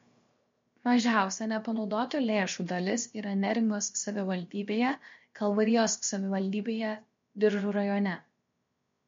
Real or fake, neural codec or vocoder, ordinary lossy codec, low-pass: fake; codec, 16 kHz, 0.7 kbps, FocalCodec; MP3, 48 kbps; 7.2 kHz